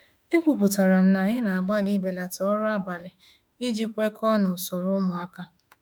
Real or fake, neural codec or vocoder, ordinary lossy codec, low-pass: fake; autoencoder, 48 kHz, 32 numbers a frame, DAC-VAE, trained on Japanese speech; none; none